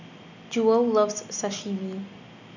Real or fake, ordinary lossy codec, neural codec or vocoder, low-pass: real; none; none; 7.2 kHz